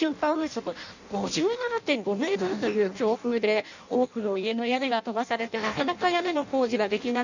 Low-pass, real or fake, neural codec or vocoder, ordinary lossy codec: 7.2 kHz; fake; codec, 16 kHz in and 24 kHz out, 0.6 kbps, FireRedTTS-2 codec; none